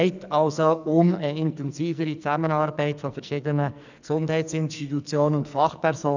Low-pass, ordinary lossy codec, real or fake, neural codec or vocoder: 7.2 kHz; none; fake; codec, 44.1 kHz, 2.6 kbps, SNAC